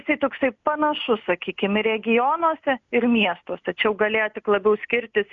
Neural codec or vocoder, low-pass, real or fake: none; 7.2 kHz; real